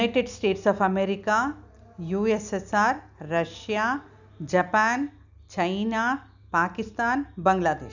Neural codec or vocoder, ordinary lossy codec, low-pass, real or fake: none; none; 7.2 kHz; real